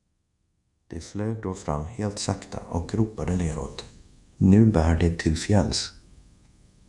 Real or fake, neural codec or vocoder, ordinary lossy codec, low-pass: fake; codec, 24 kHz, 1.2 kbps, DualCodec; MP3, 96 kbps; 10.8 kHz